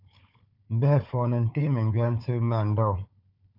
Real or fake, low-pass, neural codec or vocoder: fake; 5.4 kHz; codec, 16 kHz, 4 kbps, FunCodec, trained on Chinese and English, 50 frames a second